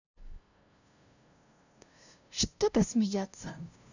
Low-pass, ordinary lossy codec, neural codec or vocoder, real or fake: 7.2 kHz; none; codec, 16 kHz, 0.5 kbps, FunCodec, trained on LibriTTS, 25 frames a second; fake